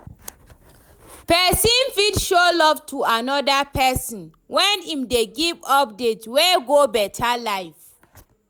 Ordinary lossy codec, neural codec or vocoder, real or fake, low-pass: none; none; real; none